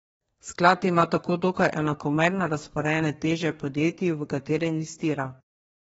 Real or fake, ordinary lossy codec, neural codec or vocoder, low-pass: fake; AAC, 24 kbps; codec, 32 kHz, 1.9 kbps, SNAC; 14.4 kHz